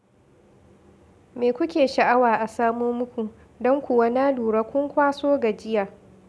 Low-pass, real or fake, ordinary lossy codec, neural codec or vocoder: none; real; none; none